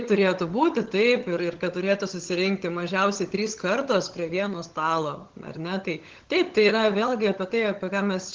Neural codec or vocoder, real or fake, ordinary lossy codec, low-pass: codec, 16 kHz, 16 kbps, FunCodec, trained on LibriTTS, 50 frames a second; fake; Opus, 16 kbps; 7.2 kHz